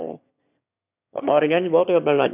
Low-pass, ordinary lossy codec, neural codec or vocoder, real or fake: 3.6 kHz; none; autoencoder, 22.05 kHz, a latent of 192 numbers a frame, VITS, trained on one speaker; fake